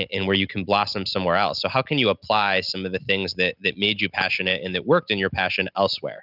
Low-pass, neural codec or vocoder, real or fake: 5.4 kHz; none; real